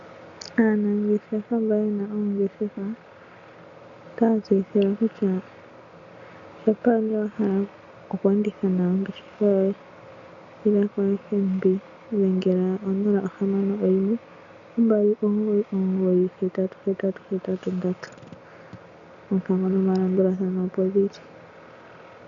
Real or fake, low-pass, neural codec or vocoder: real; 7.2 kHz; none